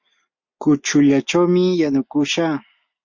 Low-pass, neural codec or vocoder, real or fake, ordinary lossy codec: 7.2 kHz; none; real; MP3, 48 kbps